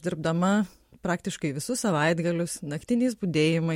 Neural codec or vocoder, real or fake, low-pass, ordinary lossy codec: none; real; 19.8 kHz; MP3, 64 kbps